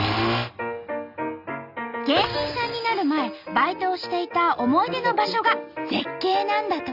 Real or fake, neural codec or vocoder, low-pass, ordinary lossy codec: real; none; 5.4 kHz; none